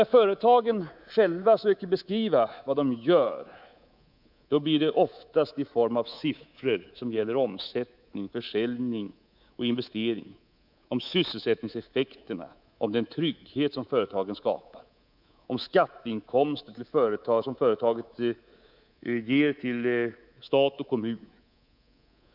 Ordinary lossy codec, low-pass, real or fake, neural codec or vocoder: none; 5.4 kHz; fake; codec, 24 kHz, 3.1 kbps, DualCodec